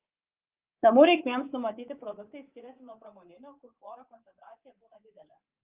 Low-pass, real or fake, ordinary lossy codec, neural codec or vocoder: 3.6 kHz; fake; Opus, 32 kbps; codec, 24 kHz, 3.1 kbps, DualCodec